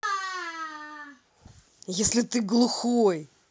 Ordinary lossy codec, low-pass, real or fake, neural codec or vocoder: none; none; real; none